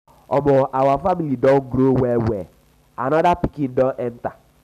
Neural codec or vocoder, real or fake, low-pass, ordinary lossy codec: none; real; 14.4 kHz; none